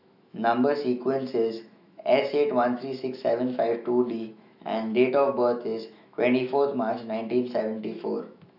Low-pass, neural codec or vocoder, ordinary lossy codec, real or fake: 5.4 kHz; autoencoder, 48 kHz, 128 numbers a frame, DAC-VAE, trained on Japanese speech; none; fake